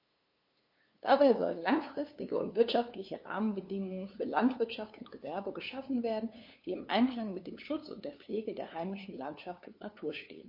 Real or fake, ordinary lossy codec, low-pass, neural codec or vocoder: fake; MP3, 32 kbps; 5.4 kHz; codec, 16 kHz, 2 kbps, FunCodec, trained on LibriTTS, 25 frames a second